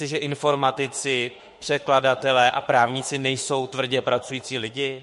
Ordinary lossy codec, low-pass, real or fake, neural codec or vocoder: MP3, 48 kbps; 14.4 kHz; fake; autoencoder, 48 kHz, 32 numbers a frame, DAC-VAE, trained on Japanese speech